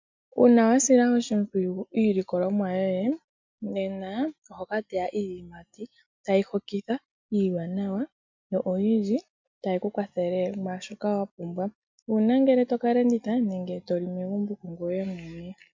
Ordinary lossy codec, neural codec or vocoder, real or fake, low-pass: MP3, 64 kbps; none; real; 7.2 kHz